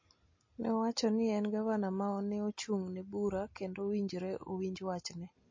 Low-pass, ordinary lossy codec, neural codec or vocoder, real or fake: 7.2 kHz; MP3, 32 kbps; none; real